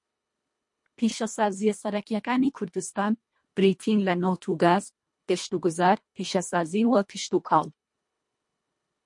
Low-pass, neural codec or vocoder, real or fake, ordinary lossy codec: 10.8 kHz; codec, 24 kHz, 1.5 kbps, HILCodec; fake; MP3, 48 kbps